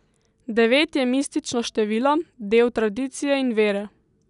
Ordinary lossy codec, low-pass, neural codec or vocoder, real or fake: none; 10.8 kHz; none; real